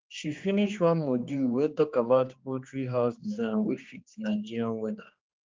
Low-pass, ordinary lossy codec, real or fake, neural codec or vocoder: 7.2 kHz; Opus, 32 kbps; fake; codec, 16 kHz, 2 kbps, X-Codec, HuBERT features, trained on general audio